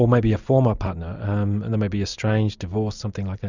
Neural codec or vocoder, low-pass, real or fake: none; 7.2 kHz; real